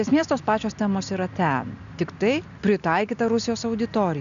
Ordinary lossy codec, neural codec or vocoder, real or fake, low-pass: MP3, 96 kbps; none; real; 7.2 kHz